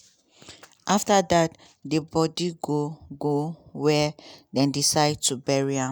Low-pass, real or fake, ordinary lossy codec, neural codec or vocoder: none; real; none; none